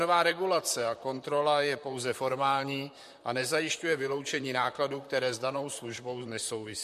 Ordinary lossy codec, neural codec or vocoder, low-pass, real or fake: MP3, 64 kbps; vocoder, 44.1 kHz, 128 mel bands, Pupu-Vocoder; 14.4 kHz; fake